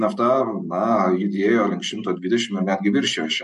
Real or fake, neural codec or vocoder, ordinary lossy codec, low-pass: real; none; MP3, 48 kbps; 14.4 kHz